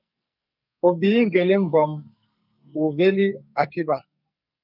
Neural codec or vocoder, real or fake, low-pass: codec, 44.1 kHz, 2.6 kbps, SNAC; fake; 5.4 kHz